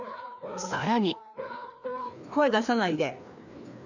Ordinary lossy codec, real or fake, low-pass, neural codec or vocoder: none; fake; 7.2 kHz; codec, 16 kHz, 2 kbps, FreqCodec, larger model